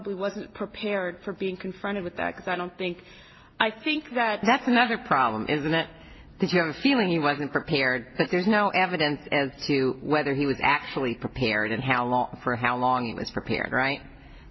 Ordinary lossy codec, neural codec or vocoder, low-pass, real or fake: MP3, 24 kbps; none; 7.2 kHz; real